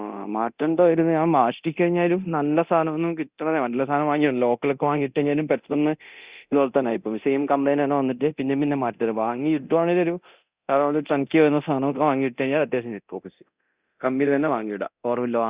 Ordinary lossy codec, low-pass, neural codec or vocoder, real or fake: Opus, 64 kbps; 3.6 kHz; codec, 24 kHz, 0.9 kbps, DualCodec; fake